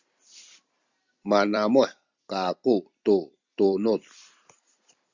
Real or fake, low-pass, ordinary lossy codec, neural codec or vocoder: fake; 7.2 kHz; Opus, 64 kbps; vocoder, 44.1 kHz, 128 mel bands every 256 samples, BigVGAN v2